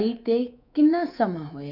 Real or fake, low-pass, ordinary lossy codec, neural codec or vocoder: fake; 5.4 kHz; none; codec, 24 kHz, 3.1 kbps, DualCodec